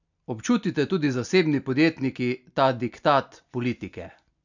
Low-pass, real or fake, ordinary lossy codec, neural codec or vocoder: 7.2 kHz; real; none; none